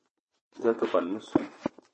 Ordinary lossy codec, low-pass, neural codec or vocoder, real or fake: MP3, 32 kbps; 9.9 kHz; vocoder, 22.05 kHz, 80 mel bands, WaveNeXt; fake